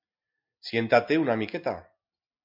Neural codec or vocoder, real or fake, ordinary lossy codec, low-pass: none; real; MP3, 48 kbps; 5.4 kHz